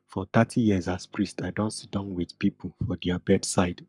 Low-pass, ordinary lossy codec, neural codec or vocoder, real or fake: 10.8 kHz; none; codec, 44.1 kHz, 7.8 kbps, Pupu-Codec; fake